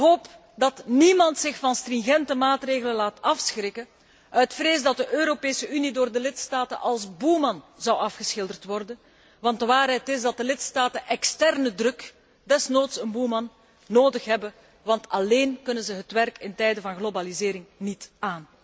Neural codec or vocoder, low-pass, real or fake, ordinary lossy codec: none; none; real; none